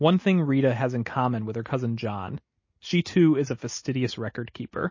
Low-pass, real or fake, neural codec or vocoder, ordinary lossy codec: 7.2 kHz; real; none; MP3, 32 kbps